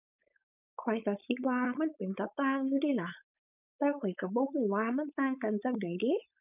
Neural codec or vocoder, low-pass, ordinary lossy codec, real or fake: codec, 16 kHz, 4.8 kbps, FACodec; 3.6 kHz; none; fake